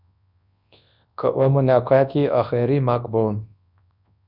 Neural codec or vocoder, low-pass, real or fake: codec, 24 kHz, 0.9 kbps, WavTokenizer, large speech release; 5.4 kHz; fake